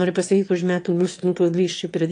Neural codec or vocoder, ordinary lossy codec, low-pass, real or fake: autoencoder, 22.05 kHz, a latent of 192 numbers a frame, VITS, trained on one speaker; AAC, 48 kbps; 9.9 kHz; fake